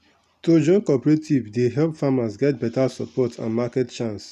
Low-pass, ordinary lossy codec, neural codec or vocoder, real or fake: 14.4 kHz; none; none; real